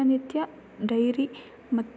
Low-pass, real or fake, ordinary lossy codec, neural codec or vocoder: none; real; none; none